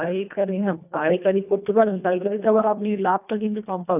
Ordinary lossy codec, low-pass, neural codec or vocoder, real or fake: none; 3.6 kHz; codec, 24 kHz, 1.5 kbps, HILCodec; fake